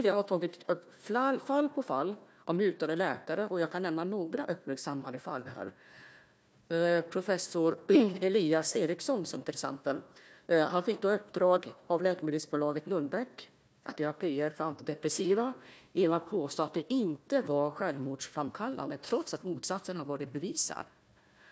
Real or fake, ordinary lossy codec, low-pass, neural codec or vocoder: fake; none; none; codec, 16 kHz, 1 kbps, FunCodec, trained on Chinese and English, 50 frames a second